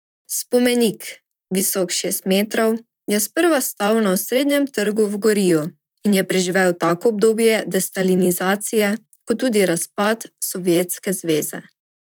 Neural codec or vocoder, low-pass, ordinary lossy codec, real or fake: vocoder, 44.1 kHz, 128 mel bands, Pupu-Vocoder; none; none; fake